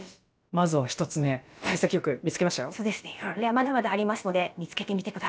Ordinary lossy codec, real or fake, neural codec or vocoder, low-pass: none; fake; codec, 16 kHz, about 1 kbps, DyCAST, with the encoder's durations; none